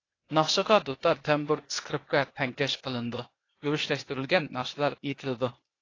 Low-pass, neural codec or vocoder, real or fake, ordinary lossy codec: 7.2 kHz; codec, 16 kHz, 0.8 kbps, ZipCodec; fake; AAC, 32 kbps